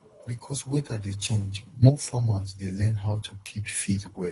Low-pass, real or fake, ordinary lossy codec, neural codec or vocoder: 10.8 kHz; fake; AAC, 32 kbps; codec, 24 kHz, 3 kbps, HILCodec